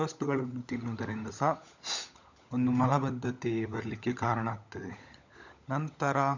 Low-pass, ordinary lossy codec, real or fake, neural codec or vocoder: 7.2 kHz; none; fake; codec, 16 kHz, 16 kbps, FunCodec, trained on LibriTTS, 50 frames a second